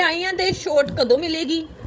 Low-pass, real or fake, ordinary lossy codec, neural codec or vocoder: none; fake; none; codec, 16 kHz, 16 kbps, FreqCodec, larger model